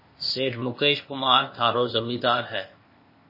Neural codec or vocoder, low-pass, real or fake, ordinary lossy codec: codec, 16 kHz, 0.8 kbps, ZipCodec; 5.4 kHz; fake; MP3, 24 kbps